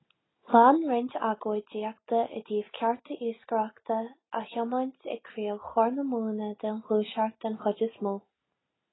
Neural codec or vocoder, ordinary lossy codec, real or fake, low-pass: none; AAC, 16 kbps; real; 7.2 kHz